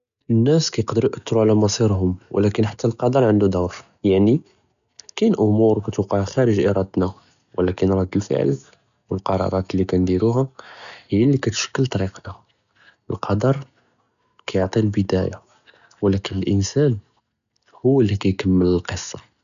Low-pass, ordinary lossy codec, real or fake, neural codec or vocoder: 7.2 kHz; none; real; none